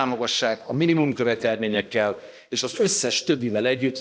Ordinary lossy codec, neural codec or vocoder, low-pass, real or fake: none; codec, 16 kHz, 1 kbps, X-Codec, HuBERT features, trained on balanced general audio; none; fake